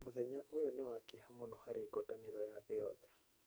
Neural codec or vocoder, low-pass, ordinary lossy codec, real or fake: codec, 44.1 kHz, 2.6 kbps, SNAC; none; none; fake